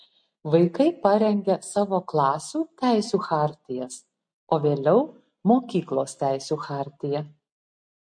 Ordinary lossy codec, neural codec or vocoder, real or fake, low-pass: MP3, 48 kbps; none; real; 9.9 kHz